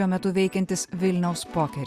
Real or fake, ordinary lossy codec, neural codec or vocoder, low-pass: real; Opus, 64 kbps; none; 14.4 kHz